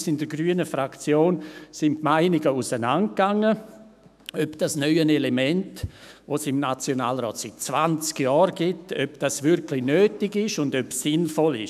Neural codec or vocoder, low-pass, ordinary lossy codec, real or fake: autoencoder, 48 kHz, 128 numbers a frame, DAC-VAE, trained on Japanese speech; 14.4 kHz; none; fake